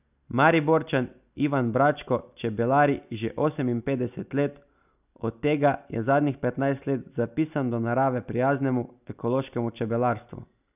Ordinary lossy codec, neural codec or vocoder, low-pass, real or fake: none; none; 3.6 kHz; real